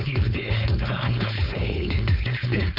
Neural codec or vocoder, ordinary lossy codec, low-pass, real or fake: codec, 16 kHz, 4.8 kbps, FACodec; MP3, 48 kbps; 5.4 kHz; fake